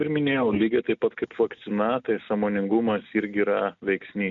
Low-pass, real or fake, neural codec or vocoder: 7.2 kHz; real; none